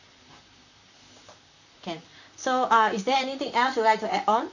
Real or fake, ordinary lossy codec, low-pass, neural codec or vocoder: fake; none; 7.2 kHz; vocoder, 44.1 kHz, 128 mel bands, Pupu-Vocoder